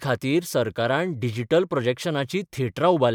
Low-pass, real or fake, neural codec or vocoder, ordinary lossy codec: 19.8 kHz; fake; vocoder, 44.1 kHz, 128 mel bands every 512 samples, BigVGAN v2; none